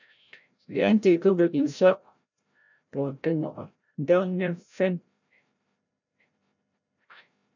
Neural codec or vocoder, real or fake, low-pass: codec, 16 kHz, 0.5 kbps, FreqCodec, larger model; fake; 7.2 kHz